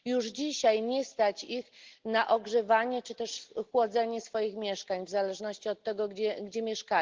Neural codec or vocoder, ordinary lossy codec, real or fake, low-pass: none; Opus, 16 kbps; real; 7.2 kHz